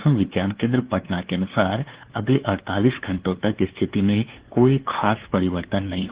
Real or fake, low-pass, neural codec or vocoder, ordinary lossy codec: fake; 3.6 kHz; codec, 16 kHz, 2 kbps, FunCodec, trained on LibriTTS, 25 frames a second; Opus, 16 kbps